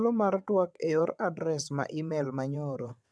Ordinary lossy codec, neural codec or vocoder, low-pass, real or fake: none; vocoder, 22.05 kHz, 80 mel bands, WaveNeXt; none; fake